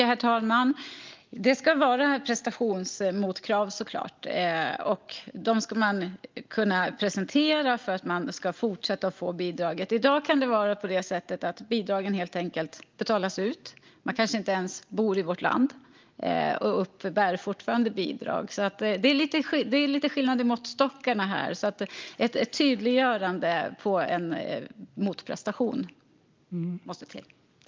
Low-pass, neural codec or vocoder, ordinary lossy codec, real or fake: 7.2 kHz; none; Opus, 24 kbps; real